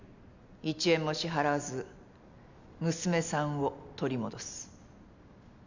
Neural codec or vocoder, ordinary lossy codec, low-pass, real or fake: none; none; 7.2 kHz; real